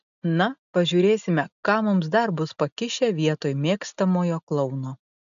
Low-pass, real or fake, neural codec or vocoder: 7.2 kHz; real; none